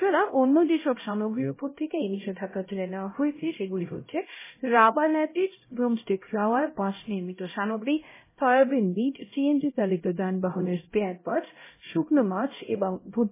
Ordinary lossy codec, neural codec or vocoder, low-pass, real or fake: MP3, 16 kbps; codec, 16 kHz, 0.5 kbps, X-Codec, HuBERT features, trained on LibriSpeech; 3.6 kHz; fake